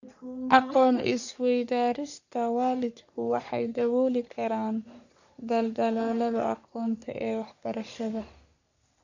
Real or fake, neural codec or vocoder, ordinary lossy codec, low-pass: fake; codec, 44.1 kHz, 3.4 kbps, Pupu-Codec; none; 7.2 kHz